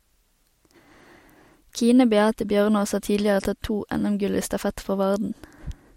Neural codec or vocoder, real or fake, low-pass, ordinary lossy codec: none; real; 19.8 kHz; MP3, 64 kbps